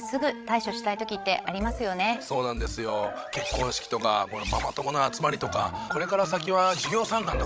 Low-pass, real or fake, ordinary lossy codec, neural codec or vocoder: none; fake; none; codec, 16 kHz, 16 kbps, FreqCodec, larger model